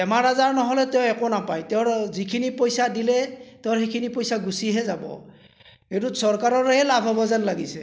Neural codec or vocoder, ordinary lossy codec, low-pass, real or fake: none; none; none; real